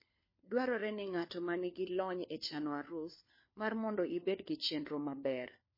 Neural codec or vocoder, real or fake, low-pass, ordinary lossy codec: codec, 16 kHz, 4 kbps, FunCodec, trained on LibriTTS, 50 frames a second; fake; 5.4 kHz; MP3, 24 kbps